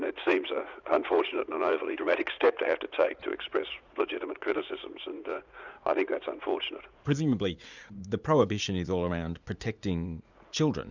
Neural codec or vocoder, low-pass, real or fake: vocoder, 44.1 kHz, 80 mel bands, Vocos; 7.2 kHz; fake